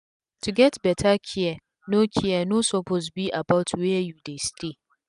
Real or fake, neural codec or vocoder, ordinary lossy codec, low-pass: real; none; none; 10.8 kHz